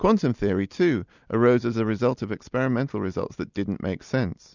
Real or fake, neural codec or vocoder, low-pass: real; none; 7.2 kHz